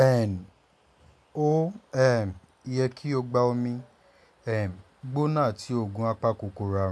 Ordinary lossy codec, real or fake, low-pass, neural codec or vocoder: none; real; none; none